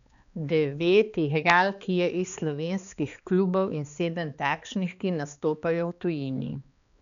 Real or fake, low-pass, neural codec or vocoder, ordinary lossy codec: fake; 7.2 kHz; codec, 16 kHz, 2 kbps, X-Codec, HuBERT features, trained on balanced general audio; none